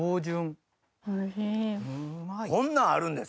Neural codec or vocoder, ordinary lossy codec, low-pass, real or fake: none; none; none; real